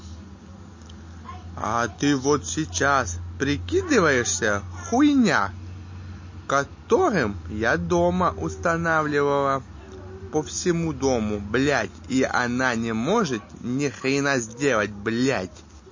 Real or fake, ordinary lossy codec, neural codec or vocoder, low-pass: real; MP3, 32 kbps; none; 7.2 kHz